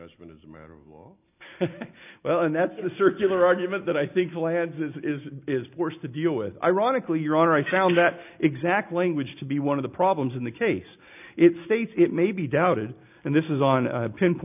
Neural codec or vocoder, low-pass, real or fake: none; 3.6 kHz; real